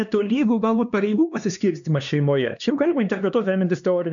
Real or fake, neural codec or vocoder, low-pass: fake; codec, 16 kHz, 2 kbps, X-Codec, HuBERT features, trained on LibriSpeech; 7.2 kHz